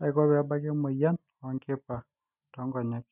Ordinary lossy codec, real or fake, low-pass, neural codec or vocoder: none; real; 3.6 kHz; none